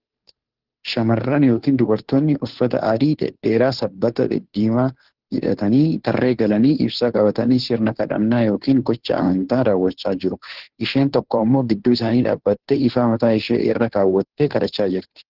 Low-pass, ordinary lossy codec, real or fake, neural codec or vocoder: 5.4 kHz; Opus, 16 kbps; fake; codec, 16 kHz, 2 kbps, FunCodec, trained on Chinese and English, 25 frames a second